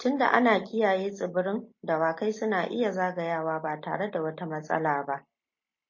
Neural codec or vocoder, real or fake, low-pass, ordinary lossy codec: none; real; 7.2 kHz; MP3, 32 kbps